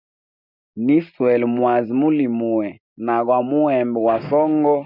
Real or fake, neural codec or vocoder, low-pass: real; none; 5.4 kHz